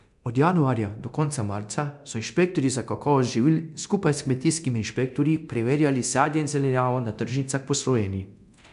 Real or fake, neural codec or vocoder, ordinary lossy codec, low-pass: fake; codec, 24 kHz, 0.9 kbps, DualCodec; none; 10.8 kHz